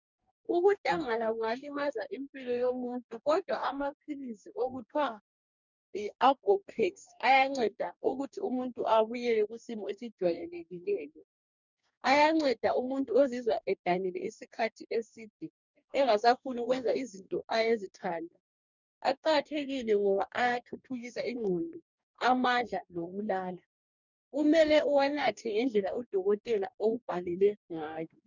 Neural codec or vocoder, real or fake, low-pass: codec, 44.1 kHz, 2.6 kbps, DAC; fake; 7.2 kHz